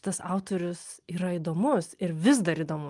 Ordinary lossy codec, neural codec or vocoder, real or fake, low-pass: Opus, 32 kbps; none; real; 10.8 kHz